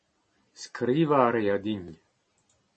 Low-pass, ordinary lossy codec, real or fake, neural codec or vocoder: 9.9 kHz; MP3, 32 kbps; real; none